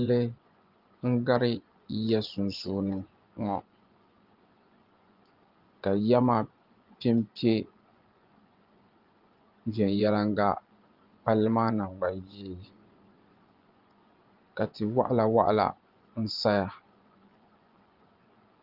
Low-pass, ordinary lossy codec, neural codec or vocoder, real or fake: 5.4 kHz; Opus, 32 kbps; vocoder, 24 kHz, 100 mel bands, Vocos; fake